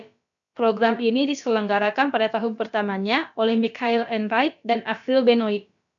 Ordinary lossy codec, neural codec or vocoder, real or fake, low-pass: MP3, 96 kbps; codec, 16 kHz, about 1 kbps, DyCAST, with the encoder's durations; fake; 7.2 kHz